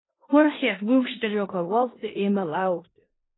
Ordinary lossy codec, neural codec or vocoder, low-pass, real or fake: AAC, 16 kbps; codec, 16 kHz in and 24 kHz out, 0.4 kbps, LongCat-Audio-Codec, four codebook decoder; 7.2 kHz; fake